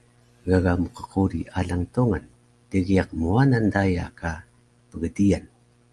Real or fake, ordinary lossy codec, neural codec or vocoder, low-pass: real; Opus, 32 kbps; none; 10.8 kHz